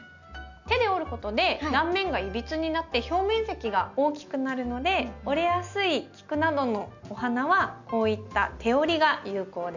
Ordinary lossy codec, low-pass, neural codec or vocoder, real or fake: none; 7.2 kHz; none; real